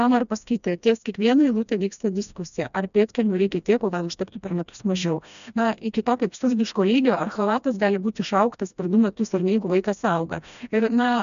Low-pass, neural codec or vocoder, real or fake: 7.2 kHz; codec, 16 kHz, 1 kbps, FreqCodec, smaller model; fake